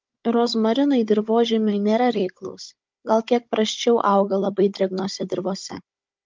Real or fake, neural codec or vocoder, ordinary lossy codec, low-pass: fake; codec, 16 kHz, 16 kbps, FunCodec, trained on Chinese and English, 50 frames a second; Opus, 24 kbps; 7.2 kHz